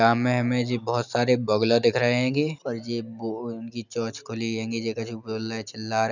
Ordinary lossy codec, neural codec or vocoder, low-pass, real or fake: none; none; 7.2 kHz; real